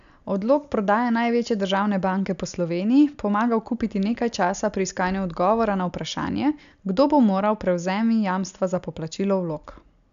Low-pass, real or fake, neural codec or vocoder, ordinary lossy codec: 7.2 kHz; real; none; AAC, 96 kbps